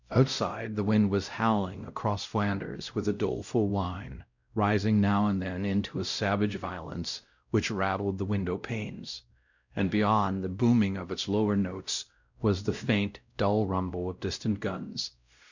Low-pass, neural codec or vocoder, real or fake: 7.2 kHz; codec, 16 kHz, 0.5 kbps, X-Codec, WavLM features, trained on Multilingual LibriSpeech; fake